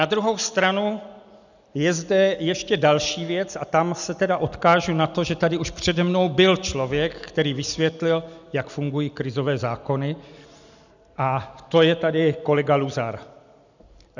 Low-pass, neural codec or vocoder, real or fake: 7.2 kHz; none; real